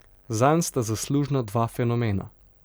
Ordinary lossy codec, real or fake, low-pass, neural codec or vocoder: none; real; none; none